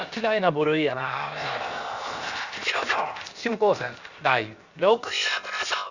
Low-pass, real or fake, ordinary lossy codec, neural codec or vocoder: 7.2 kHz; fake; Opus, 64 kbps; codec, 16 kHz, 0.7 kbps, FocalCodec